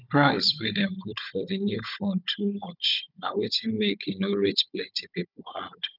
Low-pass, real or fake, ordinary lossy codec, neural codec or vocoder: 5.4 kHz; fake; none; codec, 16 kHz, 16 kbps, FunCodec, trained on Chinese and English, 50 frames a second